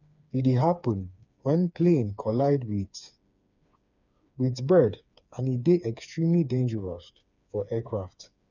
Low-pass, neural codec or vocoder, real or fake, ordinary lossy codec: 7.2 kHz; codec, 16 kHz, 4 kbps, FreqCodec, smaller model; fake; none